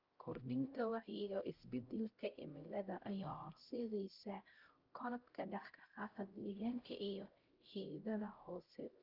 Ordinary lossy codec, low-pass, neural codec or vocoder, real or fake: Opus, 24 kbps; 5.4 kHz; codec, 16 kHz, 0.5 kbps, X-Codec, HuBERT features, trained on LibriSpeech; fake